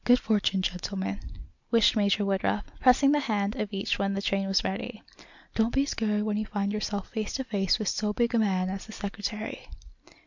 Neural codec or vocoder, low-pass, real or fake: none; 7.2 kHz; real